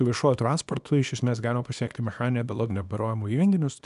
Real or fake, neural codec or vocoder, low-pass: fake; codec, 24 kHz, 0.9 kbps, WavTokenizer, small release; 10.8 kHz